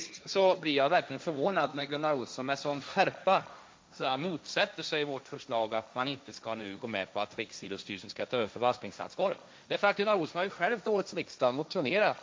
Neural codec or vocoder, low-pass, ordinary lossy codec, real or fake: codec, 16 kHz, 1.1 kbps, Voila-Tokenizer; none; none; fake